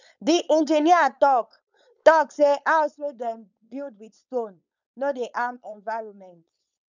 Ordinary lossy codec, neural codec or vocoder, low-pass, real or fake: none; codec, 16 kHz, 4.8 kbps, FACodec; 7.2 kHz; fake